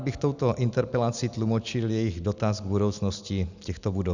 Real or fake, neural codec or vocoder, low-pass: real; none; 7.2 kHz